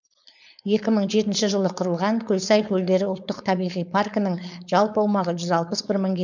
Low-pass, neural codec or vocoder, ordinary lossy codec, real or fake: 7.2 kHz; codec, 16 kHz, 4.8 kbps, FACodec; none; fake